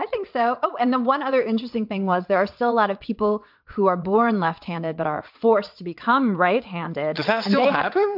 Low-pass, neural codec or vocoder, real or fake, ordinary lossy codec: 5.4 kHz; vocoder, 22.05 kHz, 80 mel bands, WaveNeXt; fake; MP3, 48 kbps